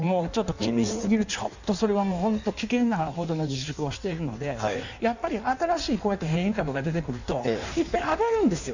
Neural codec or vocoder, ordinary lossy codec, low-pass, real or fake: codec, 16 kHz in and 24 kHz out, 1.1 kbps, FireRedTTS-2 codec; none; 7.2 kHz; fake